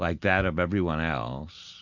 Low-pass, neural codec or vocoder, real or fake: 7.2 kHz; none; real